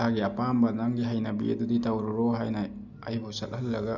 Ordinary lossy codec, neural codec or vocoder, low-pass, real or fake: none; none; 7.2 kHz; real